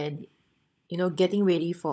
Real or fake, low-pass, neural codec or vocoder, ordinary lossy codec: fake; none; codec, 16 kHz, 16 kbps, FunCodec, trained on LibriTTS, 50 frames a second; none